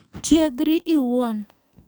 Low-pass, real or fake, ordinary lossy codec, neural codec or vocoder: none; fake; none; codec, 44.1 kHz, 2.6 kbps, SNAC